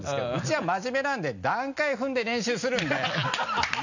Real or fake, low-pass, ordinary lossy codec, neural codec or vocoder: real; 7.2 kHz; none; none